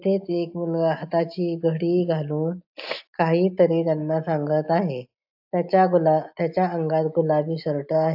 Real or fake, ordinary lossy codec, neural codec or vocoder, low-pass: real; AAC, 48 kbps; none; 5.4 kHz